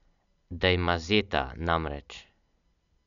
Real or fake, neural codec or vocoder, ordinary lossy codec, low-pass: real; none; none; 7.2 kHz